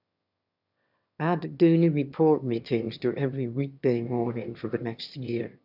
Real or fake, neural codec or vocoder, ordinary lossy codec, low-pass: fake; autoencoder, 22.05 kHz, a latent of 192 numbers a frame, VITS, trained on one speaker; none; 5.4 kHz